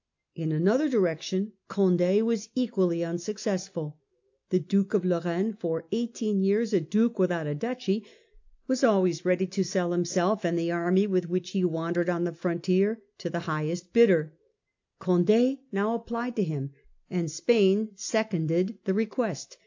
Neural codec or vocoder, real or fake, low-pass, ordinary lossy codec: none; real; 7.2 kHz; AAC, 48 kbps